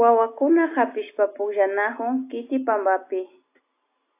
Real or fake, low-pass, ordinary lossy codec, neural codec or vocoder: real; 3.6 kHz; AAC, 24 kbps; none